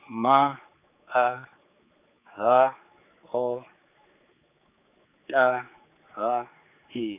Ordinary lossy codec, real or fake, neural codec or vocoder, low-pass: none; fake; codec, 16 kHz, 4 kbps, X-Codec, HuBERT features, trained on general audio; 3.6 kHz